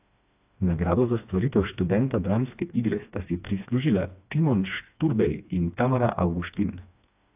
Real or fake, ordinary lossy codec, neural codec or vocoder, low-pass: fake; AAC, 32 kbps; codec, 16 kHz, 2 kbps, FreqCodec, smaller model; 3.6 kHz